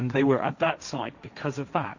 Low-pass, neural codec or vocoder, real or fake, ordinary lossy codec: 7.2 kHz; codec, 16 kHz, 1.1 kbps, Voila-Tokenizer; fake; Opus, 64 kbps